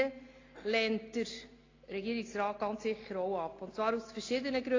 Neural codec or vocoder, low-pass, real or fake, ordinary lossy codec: none; 7.2 kHz; real; AAC, 32 kbps